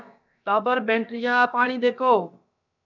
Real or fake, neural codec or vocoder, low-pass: fake; codec, 16 kHz, about 1 kbps, DyCAST, with the encoder's durations; 7.2 kHz